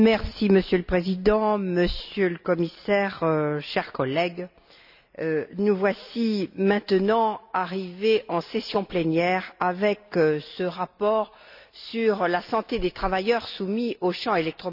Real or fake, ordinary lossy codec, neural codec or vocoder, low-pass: real; none; none; 5.4 kHz